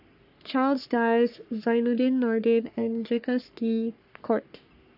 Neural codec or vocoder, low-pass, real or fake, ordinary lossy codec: codec, 44.1 kHz, 3.4 kbps, Pupu-Codec; 5.4 kHz; fake; AAC, 48 kbps